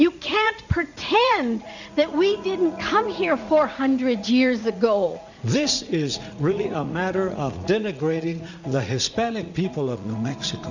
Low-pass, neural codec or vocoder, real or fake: 7.2 kHz; vocoder, 44.1 kHz, 80 mel bands, Vocos; fake